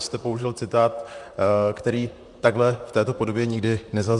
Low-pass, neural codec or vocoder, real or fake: 10.8 kHz; vocoder, 44.1 kHz, 128 mel bands, Pupu-Vocoder; fake